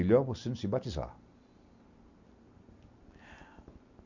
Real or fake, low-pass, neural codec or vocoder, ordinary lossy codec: real; 7.2 kHz; none; none